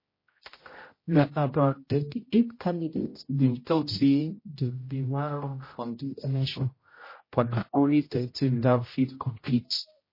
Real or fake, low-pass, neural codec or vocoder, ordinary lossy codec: fake; 5.4 kHz; codec, 16 kHz, 0.5 kbps, X-Codec, HuBERT features, trained on general audio; MP3, 24 kbps